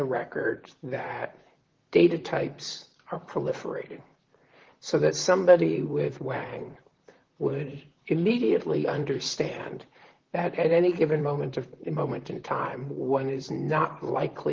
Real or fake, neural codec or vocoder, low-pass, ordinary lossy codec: fake; vocoder, 44.1 kHz, 128 mel bands, Pupu-Vocoder; 7.2 kHz; Opus, 16 kbps